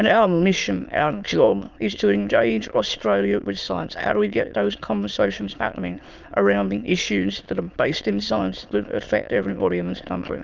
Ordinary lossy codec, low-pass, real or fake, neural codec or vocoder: Opus, 32 kbps; 7.2 kHz; fake; autoencoder, 22.05 kHz, a latent of 192 numbers a frame, VITS, trained on many speakers